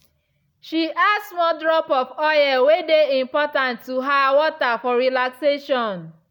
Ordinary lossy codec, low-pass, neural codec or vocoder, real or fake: none; 19.8 kHz; none; real